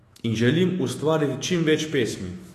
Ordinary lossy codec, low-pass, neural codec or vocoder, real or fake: AAC, 48 kbps; 14.4 kHz; autoencoder, 48 kHz, 128 numbers a frame, DAC-VAE, trained on Japanese speech; fake